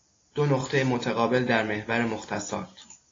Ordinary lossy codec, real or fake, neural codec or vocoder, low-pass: AAC, 32 kbps; real; none; 7.2 kHz